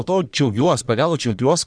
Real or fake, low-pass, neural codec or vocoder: fake; 9.9 kHz; codec, 44.1 kHz, 1.7 kbps, Pupu-Codec